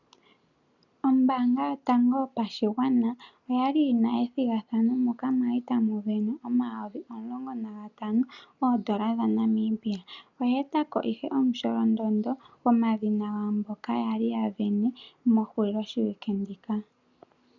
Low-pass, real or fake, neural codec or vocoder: 7.2 kHz; real; none